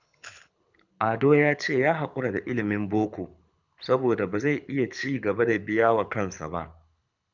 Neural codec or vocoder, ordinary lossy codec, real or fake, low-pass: codec, 24 kHz, 6 kbps, HILCodec; none; fake; 7.2 kHz